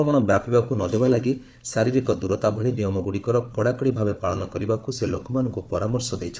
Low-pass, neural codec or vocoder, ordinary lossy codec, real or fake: none; codec, 16 kHz, 4 kbps, FunCodec, trained on Chinese and English, 50 frames a second; none; fake